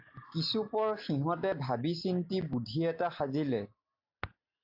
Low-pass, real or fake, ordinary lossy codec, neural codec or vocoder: 5.4 kHz; real; AAC, 48 kbps; none